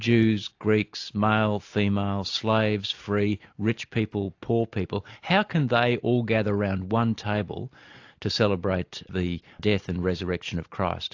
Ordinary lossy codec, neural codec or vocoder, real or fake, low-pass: AAC, 48 kbps; none; real; 7.2 kHz